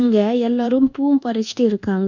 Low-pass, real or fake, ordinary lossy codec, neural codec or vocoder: 7.2 kHz; fake; none; codec, 24 kHz, 1.2 kbps, DualCodec